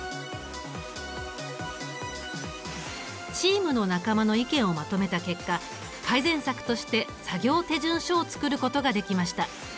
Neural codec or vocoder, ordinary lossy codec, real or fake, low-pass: none; none; real; none